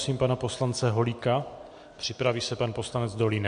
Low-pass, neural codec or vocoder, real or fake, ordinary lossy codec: 9.9 kHz; none; real; MP3, 64 kbps